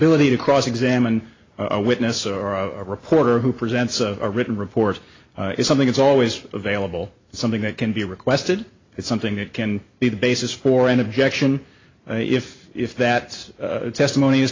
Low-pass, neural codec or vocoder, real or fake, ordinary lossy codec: 7.2 kHz; none; real; AAC, 32 kbps